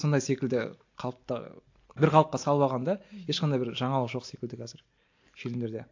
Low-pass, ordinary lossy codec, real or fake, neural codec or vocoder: 7.2 kHz; AAC, 48 kbps; real; none